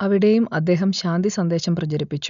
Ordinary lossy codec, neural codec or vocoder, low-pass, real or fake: none; none; 7.2 kHz; real